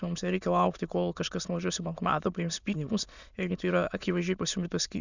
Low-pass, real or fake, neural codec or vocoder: 7.2 kHz; fake; autoencoder, 22.05 kHz, a latent of 192 numbers a frame, VITS, trained on many speakers